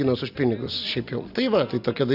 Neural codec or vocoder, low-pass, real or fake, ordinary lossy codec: none; 5.4 kHz; real; AAC, 48 kbps